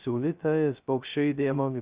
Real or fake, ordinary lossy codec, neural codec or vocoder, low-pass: fake; Opus, 64 kbps; codec, 16 kHz, 0.2 kbps, FocalCodec; 3.6 kHz